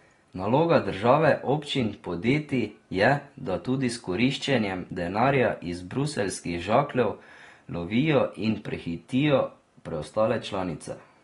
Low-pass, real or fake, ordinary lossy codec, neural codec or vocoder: 10.8 kHz; real; AAC, 32 kbps; none